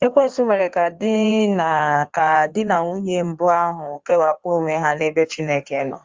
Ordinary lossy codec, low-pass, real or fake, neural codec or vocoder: Opus, 32 kbps; 7.2 kHz; fake; codec, 16 kHz in and 24 kHz out, 1.1 kbps, FireRedTTS-2 codec